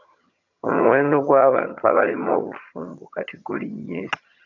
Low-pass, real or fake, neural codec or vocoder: 7.2 kHz; fake; vocoder, 22.05 kHz, 80 mel bands, HiFi-GAN